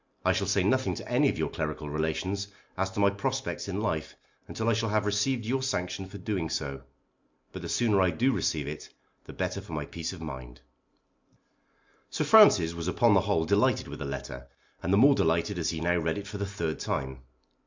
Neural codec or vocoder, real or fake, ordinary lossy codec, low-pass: none; real; MP3, 64 kbps; 7.2 kHz